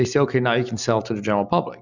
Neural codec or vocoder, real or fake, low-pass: none; real; 7.2 kHz